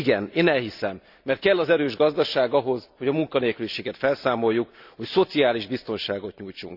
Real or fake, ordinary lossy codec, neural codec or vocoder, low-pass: fake; none; vocoder, 44.1 kHz, 128 mel bands every 256 samples, BigVGAN v2; 5.4 kHz